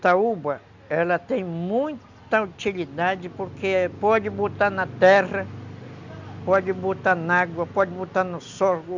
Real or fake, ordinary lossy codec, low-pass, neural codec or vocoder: real; none; 7.2 kHz; none